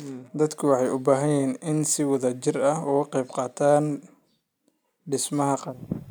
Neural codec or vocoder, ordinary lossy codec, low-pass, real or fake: none; none; none; real